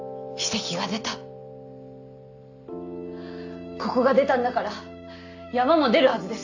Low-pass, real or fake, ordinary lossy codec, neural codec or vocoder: 7.2 kHz; real; AAC, 48 kbps; none